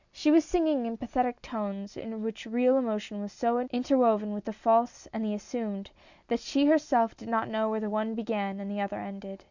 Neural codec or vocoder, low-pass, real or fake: none; 7.2 kHz; real